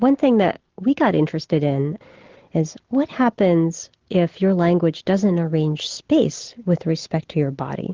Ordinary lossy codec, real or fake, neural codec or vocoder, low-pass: Opus, 16 kbps; real; none; 7.2 kHz